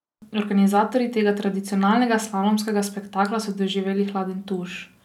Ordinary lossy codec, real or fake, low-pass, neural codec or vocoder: none; real; 19.8 kHz; none